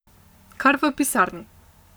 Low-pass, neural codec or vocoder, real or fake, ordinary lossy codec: none; codec, 44.1 kHz, 7.8 kbps, Pupu-Codec; fake; none